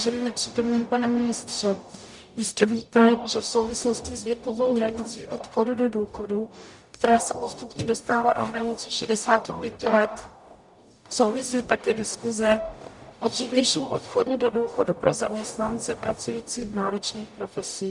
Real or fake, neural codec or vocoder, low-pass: fake; codec, 44.1 kHz, 0.9 kbps, DAC; 10.8 kHz